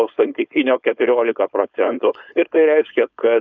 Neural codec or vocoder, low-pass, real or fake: codec, 16 kHz, 4.8 kbps, FACodec; 7.2 kHz; fake